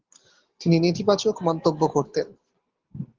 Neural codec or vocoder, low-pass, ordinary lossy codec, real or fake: none; 7.2 kHz; Opus, 16 kbps; real